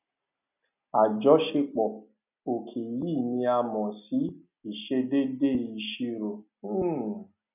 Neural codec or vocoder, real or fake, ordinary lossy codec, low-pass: none; real; none; 3.6 kHz